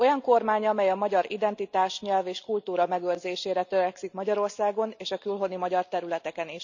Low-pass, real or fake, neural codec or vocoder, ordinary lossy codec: 7.2 kHz; real; none; none